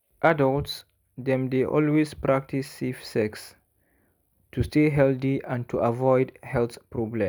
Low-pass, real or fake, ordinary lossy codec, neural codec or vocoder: none; real; none; none